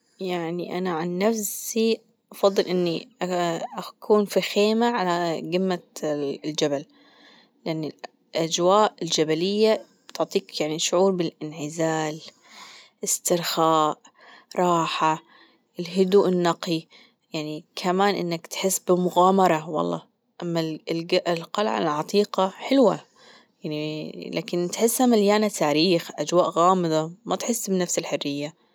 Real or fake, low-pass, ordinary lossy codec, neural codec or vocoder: real; none; none; none